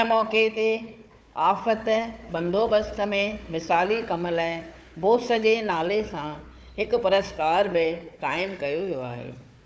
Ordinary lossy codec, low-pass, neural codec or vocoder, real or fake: none; none; codec, 16 kHz, 4 kbps, FunCodec, trained on Chinese and English, 50 frames a second; fake